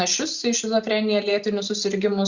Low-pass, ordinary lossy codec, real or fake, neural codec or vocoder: 7.2 kHz; Opus, 64 kbps; real; none